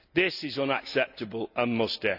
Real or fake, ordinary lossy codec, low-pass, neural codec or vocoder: real; none; 5.4 kHz; none